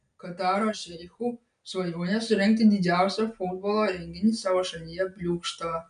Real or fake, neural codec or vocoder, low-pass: real; none; 9.9 kHz